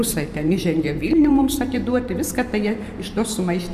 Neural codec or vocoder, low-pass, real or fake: vocoder, 44.1 kHz, 128 mel bands every 512 samples, BigVGAN v2; 14.4 kHz; fake